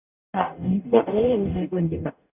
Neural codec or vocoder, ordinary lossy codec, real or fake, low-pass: codec, 44.1 kHz, 0.9 kbps, DAC; none; fake; 3.6 kHz